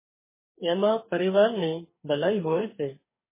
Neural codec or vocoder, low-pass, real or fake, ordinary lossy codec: codec, 44.1 kHz, 2.6 kbps, DAC; 3.6 kHz; fake; MP3, 16 kbps